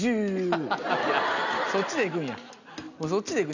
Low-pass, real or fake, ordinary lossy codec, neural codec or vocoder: 7.2 kHz; real; none; none